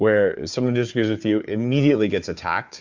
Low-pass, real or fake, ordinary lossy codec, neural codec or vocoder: 7.2 kHz; fake; MP3, 64 kbps; codec, 16 kHz, 4 kbps, FunCodec, trained on Chinese and English, 50 frames a second